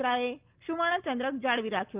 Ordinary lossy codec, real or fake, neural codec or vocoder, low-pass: Opus, 24 kbps; real; none; 3.6 kHz